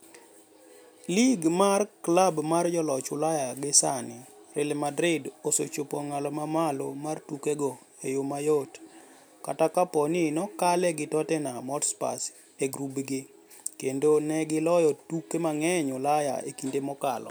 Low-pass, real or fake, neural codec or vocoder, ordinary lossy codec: none; real; none; none